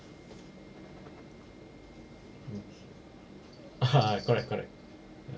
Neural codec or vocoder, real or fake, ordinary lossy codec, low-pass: none; real; none; none